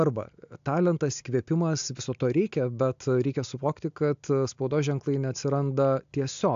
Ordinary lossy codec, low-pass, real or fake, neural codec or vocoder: AAC, 64 kbps; 7.2 kHz; real; none